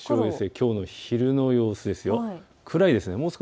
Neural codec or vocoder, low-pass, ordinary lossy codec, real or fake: none; none; none; real